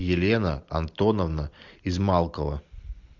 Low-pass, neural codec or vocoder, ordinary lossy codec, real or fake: 7.2 kHz; none; MP3, 64 kbps; real